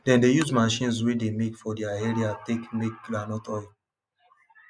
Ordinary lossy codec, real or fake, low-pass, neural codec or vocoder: none; real; none; none